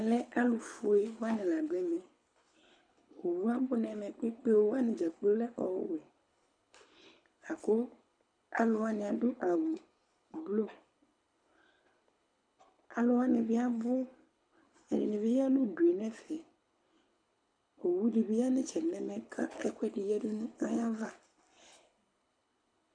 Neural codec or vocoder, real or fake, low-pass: codec, 24 kHz, 6 kbps, HILCodec; fake; 9.9 kHz